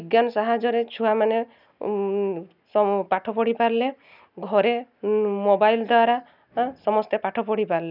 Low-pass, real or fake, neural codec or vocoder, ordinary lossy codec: 5.4 kHz; real; none; none